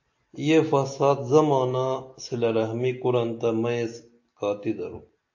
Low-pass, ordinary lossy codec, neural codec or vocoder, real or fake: 7.2 kHz; AAC, 48 kbps; none; real